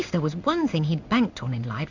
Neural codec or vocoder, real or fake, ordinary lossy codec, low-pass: codec, 16 kHz in and 24 kHz out, 1 kbps, XY-Tokenizer; fake; Opus, 64 kbps; 7.2 kHz